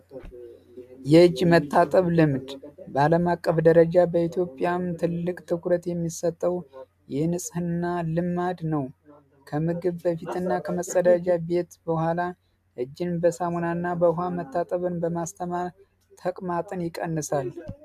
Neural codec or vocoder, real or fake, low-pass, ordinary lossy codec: none; real; 14.4 kHz; AAC, 96 kbps